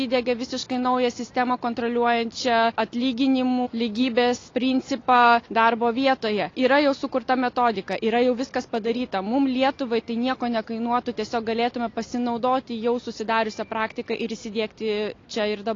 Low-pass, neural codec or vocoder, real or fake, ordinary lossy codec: 7.2 kHz; none; real; AAC, 32 kbps